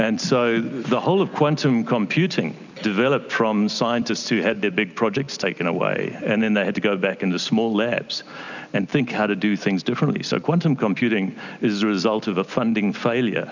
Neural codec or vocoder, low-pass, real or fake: none; 7.2 kHz; real